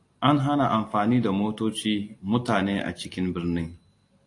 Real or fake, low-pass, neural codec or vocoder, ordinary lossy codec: real; 10.8 kHz; none; AAC, 48 kbps